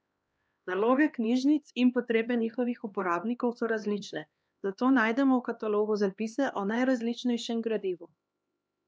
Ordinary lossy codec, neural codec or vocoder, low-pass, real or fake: none; codec, 16 kHz, 2 kbps, X-Codec, HuBERT features, trained on LibriSpeech; none; fake